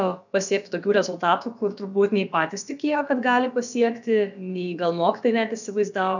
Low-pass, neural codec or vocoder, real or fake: 7.2 kHz; codec, 16 kHz, about 1 kbps, DyCAST, with the encoder's durations; fake